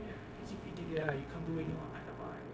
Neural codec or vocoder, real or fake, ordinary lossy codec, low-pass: codec, 16 kHz, 0.4 kbps, LongCat-Audio-Codec; fake; none; none